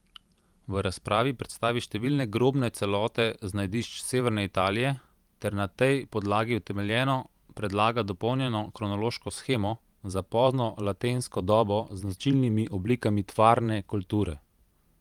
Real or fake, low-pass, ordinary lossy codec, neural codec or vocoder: fake; 19.8 kHz; Opus, 32 kbps; vocoder, 44.1 kHz, 128 mel bands every 512 samples, BigVGAN v2